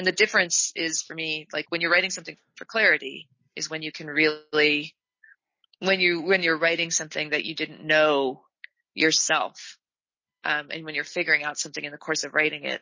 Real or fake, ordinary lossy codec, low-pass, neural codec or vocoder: real; MP3, 32 kbps; 7.2 kHz; none